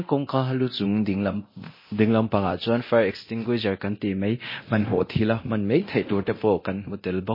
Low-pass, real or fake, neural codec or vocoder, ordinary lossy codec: 5.4 kHz; fake; codec, 24 kHz, 0.9 kbps, DualCodec; MP3, 24 kbps